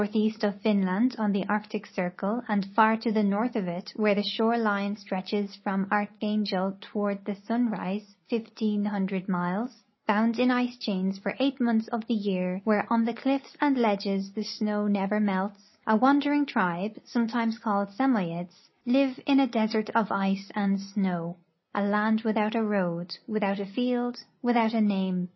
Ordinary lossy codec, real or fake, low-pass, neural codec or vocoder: MP3, 24 kbps; real; 7.2 kHz; none